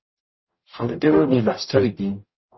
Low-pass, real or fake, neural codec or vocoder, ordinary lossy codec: 7.2 kHz; fake; codec, 44.1 kHz, 0.9 kbps, DAC; MP3, 24 kbps